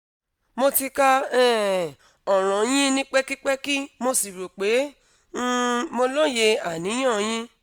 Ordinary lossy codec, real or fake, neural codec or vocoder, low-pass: none; real; none; none